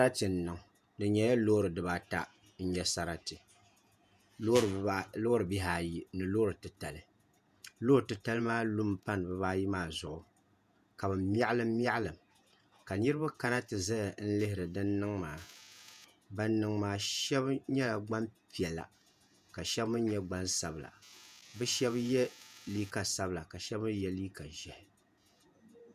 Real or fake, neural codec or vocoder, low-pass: fake; vocoder, 48 kHz, 128 mel bands, Vocos; 14.4 kHz